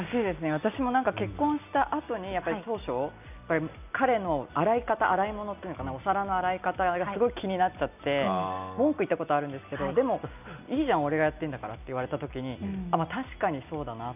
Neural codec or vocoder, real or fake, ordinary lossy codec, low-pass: none; real; none; 3.6 kHz